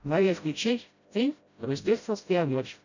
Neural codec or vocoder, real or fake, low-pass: codec, 16 kHz, 0.5 kbps, FreqCodec, smaller model; fake; 7.2 kHz